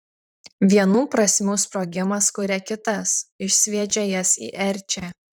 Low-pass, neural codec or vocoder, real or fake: 19.8 kHz; vocoder, 44.1 kHz, 128 mel bands, Pupu-Vocoder; fake